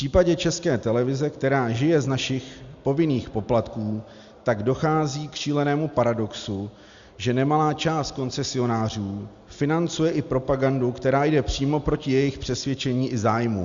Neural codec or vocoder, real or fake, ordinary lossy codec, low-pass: none; real; Opus, 64 kbps; 7.2 kHz